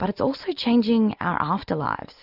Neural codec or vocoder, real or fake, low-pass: none; real; 5.4 kHz